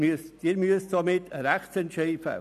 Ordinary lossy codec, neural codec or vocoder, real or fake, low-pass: none; none; real; 14.4 kHz